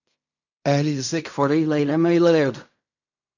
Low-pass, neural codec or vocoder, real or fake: 7.2 kHz; codec, 16 kHz in and 24 kHz out, 0.4 kbps, LongCat-Audio-Codec, fine tuned four codebook decoder; fake